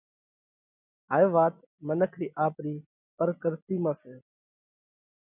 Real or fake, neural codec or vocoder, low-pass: real; none; 3.6 kHz